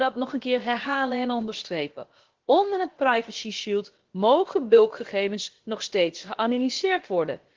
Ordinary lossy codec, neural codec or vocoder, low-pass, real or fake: Opus, 16 kbps; codec, 16 kHz, about 1 kbps, DyCAST, with the encoder's durations; 7.2 kHz; fake